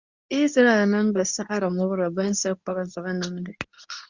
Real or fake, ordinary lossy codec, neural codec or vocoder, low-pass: fake; Opus, 64 kbps; codec, 24 kHz, 0.9 kbps, WavTokenizer, medium speech release version 2; 7.2 kHz